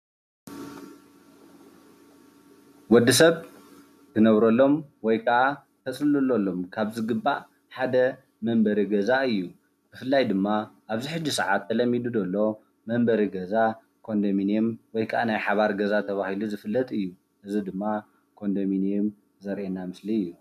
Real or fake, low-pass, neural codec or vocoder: real; 14.4 kHz; none